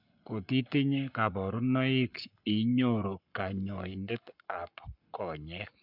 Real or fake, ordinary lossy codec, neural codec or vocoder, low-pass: fake; none; codec, 44.1 kHz, 7.8 kbps, Pupu-Codec; 5.4 kHz